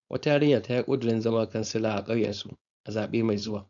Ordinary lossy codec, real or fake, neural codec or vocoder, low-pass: none; fake; codec, 16 kHz, 4.8 kbps, FACodec; 7.2 kHz